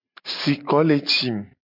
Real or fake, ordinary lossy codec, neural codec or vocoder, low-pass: real; MP3, 48 kbps; none; 5.4 kHz